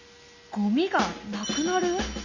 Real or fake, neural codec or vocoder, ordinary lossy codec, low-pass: real; none; Opus, 64 kbps; 7.2 kHz